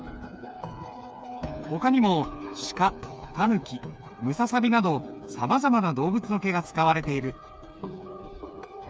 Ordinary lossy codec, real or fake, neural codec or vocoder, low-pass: none; fake; codec, 16 kHz, 4 kbps, FreqCodec, smaller model; none